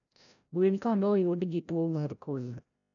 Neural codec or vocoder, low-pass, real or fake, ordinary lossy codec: codec, 16 kHz, 0.5 kbps, FreqCodec, larger model; 7.2 kHz; fake; none